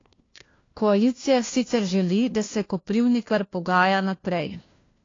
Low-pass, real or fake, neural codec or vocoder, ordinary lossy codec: 7.2 kHz; fake; codec, 16 kHz, 1 kbps, FunCodec, trained on LibriTTS, 50 frames a second; AAC, 32 kbps